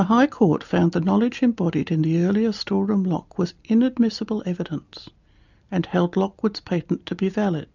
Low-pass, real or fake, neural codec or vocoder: 7.2 kHz; real; none